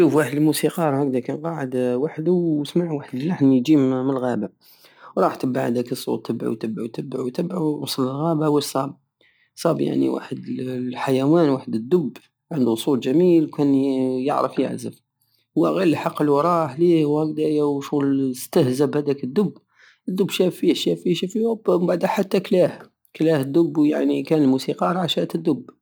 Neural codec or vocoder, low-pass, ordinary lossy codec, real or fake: none; none; none; real